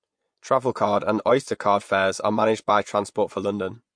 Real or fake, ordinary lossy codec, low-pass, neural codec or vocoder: fake; MP3, 48 kbps; 9.9 kHz; vocoder, 24 kHz, 100 mel bands, Vocos